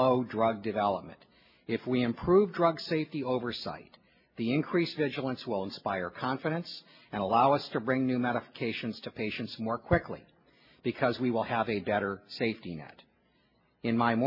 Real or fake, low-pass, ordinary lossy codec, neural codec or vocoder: real; 5.4 kHz; MP3, 24 kbps; none